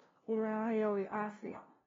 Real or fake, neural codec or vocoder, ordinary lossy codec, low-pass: fake; codec, 16 kHz, 0.5 kbps, FunCodec, trained on LibriTTS, 25 frames a second; AAC, 24 kbps; 7.2 kHz